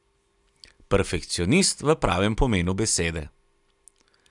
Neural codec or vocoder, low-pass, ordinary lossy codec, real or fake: none; 10.8 kHz; none; real